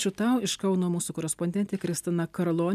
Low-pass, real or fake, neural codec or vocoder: 14.4 kHz; real; none